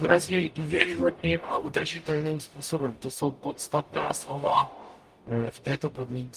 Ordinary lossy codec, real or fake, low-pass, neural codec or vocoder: Opus, 32 kbps; fake; 14.4 kHz; codec, 44.1 kHz, 0.9 kbps, DAC